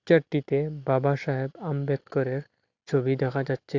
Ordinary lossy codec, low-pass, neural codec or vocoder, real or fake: AAC, 48 kbps; 7.2 kHz; none; real